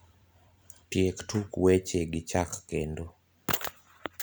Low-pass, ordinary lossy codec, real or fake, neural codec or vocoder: none; none; fake; vocoder, 44.1 kHz, 128 mel bands every 256 samples, BigVGAN v2